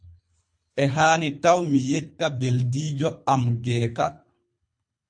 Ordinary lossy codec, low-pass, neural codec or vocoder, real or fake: MP3, 48 kbps; 9.9 kHz; codec, 24 kHz, 3 kbps, HILCodec; fake